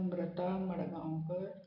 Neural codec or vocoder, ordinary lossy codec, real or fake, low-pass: none; none; real; 5.4 kHz